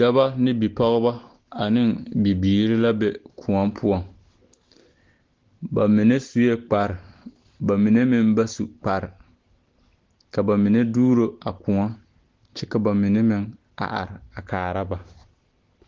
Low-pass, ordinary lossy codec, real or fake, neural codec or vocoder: 7.2 kHz; Opus, 16 kbps; real; none